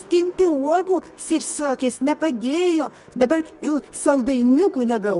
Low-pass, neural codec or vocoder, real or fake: 10.8 kHz; codec, 24 kHz, 0.9 kbps, WavTokenizer, medium music audio release; fake